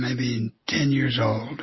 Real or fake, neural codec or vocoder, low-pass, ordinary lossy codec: real; none; 7.2 kHz; MP3, 24 kbps